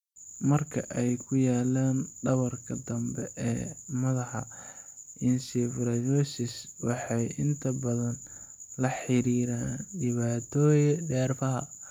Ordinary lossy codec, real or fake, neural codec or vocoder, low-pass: none; real; none; 19.8 kHz